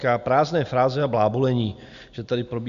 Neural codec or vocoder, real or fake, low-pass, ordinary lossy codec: none; real; 7.2 kHz; Opus, 64 kbps